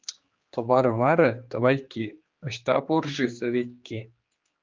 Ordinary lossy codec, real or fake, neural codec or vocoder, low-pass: Opus, 24 kbps; fake; codec, 16 kHz, 2 kbps, X-Codec, HuBERT features, trained on general audio; 7.2 kHz